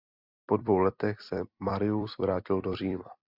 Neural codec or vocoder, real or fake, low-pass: vocoder, 44.1 kHz, 128 mel bands every 256 samples, BigVGAN v2; fake; 5.4 kHz